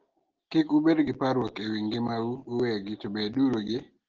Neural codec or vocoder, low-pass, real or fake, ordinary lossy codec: none; 7.2 kHz; real; Opus, 16 kbps